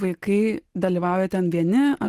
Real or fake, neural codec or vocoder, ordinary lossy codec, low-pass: real; none; Opus, 16 kbps; 14.4 kHz